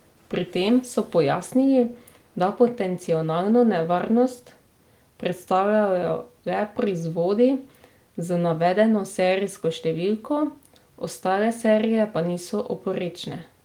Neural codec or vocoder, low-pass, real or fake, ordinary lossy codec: none; 19.8 kHz; real; Opus, 24 kbps